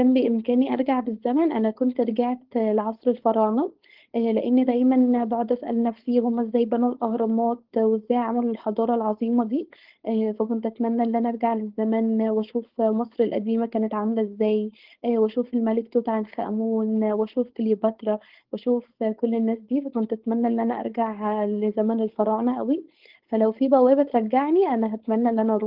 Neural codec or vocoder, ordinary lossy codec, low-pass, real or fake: codec, 16 kHz, 4.8 kbps, FACodec; Opus, 16 kbps; 5.4 kHz; fake